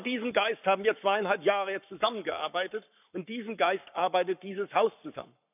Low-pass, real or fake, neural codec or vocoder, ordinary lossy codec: 3.6 kHz; fake; codec, 44.1 kHz, 7.8 kbps, Pupu-Codec; none